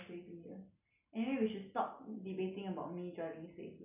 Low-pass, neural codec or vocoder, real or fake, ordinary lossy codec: 3.6 kHz; none; real; none